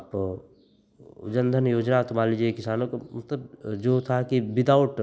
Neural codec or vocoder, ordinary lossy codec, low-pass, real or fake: none; none; none; real